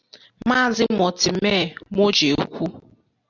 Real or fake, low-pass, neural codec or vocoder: real; 7.2 kHz; none